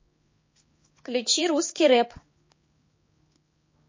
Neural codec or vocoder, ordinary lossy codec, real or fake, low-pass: codec, 16 kHz, 4 kbps, X-Codec, HuBERT features, trained on balanced general audio; MP3, 32 kbps; fake; 7.2 kHz